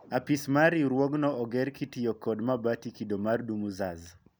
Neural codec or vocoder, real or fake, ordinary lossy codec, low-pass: none; real; none; none